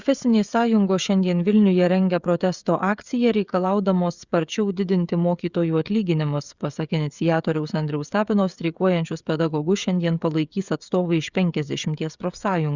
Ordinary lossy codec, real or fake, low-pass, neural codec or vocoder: Opus, 64 kbps; fake; 7.2 kHz; codec, 16 kHz, 16 kbps, FreqCodec, smaller model